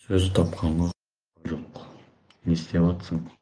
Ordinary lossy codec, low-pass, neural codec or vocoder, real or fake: Opus, 24 kbps; 9.9 kHz; vocoder, 24 kHz, 100 mel bands, Vocos; fake